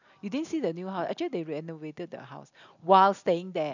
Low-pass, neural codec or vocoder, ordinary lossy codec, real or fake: 7.2 kHz; none; none; real